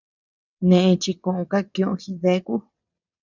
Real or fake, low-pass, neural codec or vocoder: fake; 7.2 kHz; vocoder, 22.05 kHz, 80 mel bands, WaveNeXt